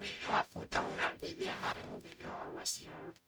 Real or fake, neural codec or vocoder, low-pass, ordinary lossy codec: fake; codec, 44.1 kHz, 0.9 kbps, DAC; none; none